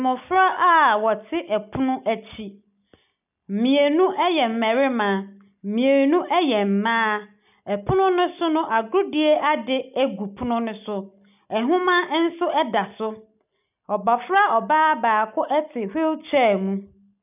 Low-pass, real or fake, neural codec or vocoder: 3.6 kHz; real; none